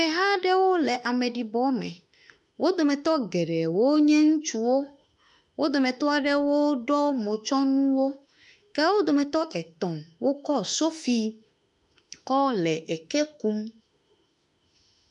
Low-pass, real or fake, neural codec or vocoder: 10.8 kHz; fake; autoencoder, 48 kHz, 32 numbers a frame, DAC-VAE, trained on Japanese speech